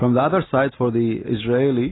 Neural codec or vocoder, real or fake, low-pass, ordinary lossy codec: none; real; 7.2 kHz; AAC, 16 kbps